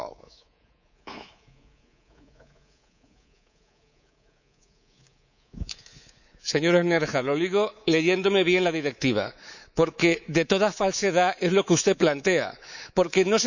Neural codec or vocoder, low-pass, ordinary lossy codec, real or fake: codec, 24 kHz, 3.1 kbps, DualCodec; 7.2 kHz; none; fake